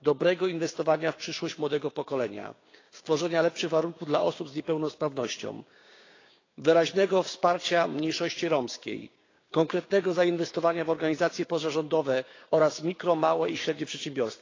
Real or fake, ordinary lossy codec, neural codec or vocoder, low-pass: fake; AAC, 32 kbps; codec, 16 kHz, 6 kbps, DAC; 7.2 kHz